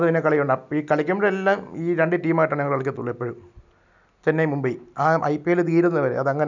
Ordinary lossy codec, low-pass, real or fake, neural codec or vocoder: none; 7.2 kHz; real; none